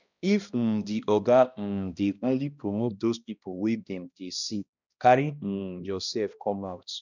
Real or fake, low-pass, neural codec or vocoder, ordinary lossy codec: fake; 7.2 kHz; codec, 16 kHz, 1 kbps, X-Codec, HuBERT features, trained on balanced general audio; none